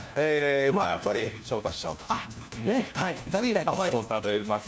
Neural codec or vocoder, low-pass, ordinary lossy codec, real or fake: codec, 16 kHz, 1 kbps, FunCodec, trained on LibriTTS, 50 frames a second; none; none; fake